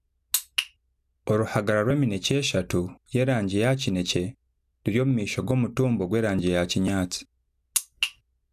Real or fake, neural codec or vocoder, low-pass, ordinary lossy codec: real; none; 14.4 kHz; none